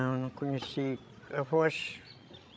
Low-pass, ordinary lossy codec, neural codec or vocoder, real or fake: none; none; codec, 16 kHz, 16 kbps, FreqCodec, larger model; fake